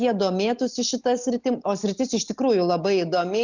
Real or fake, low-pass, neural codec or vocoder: real; 7.2 kHz; none